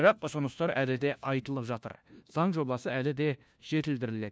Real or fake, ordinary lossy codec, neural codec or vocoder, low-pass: fake; none; codec, 16 kHz, 1 kbps, FunCodec, trained on LibriTTS, 50 frames a second; none